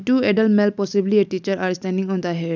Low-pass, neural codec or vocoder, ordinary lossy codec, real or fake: 7.2 kHz; none; none; real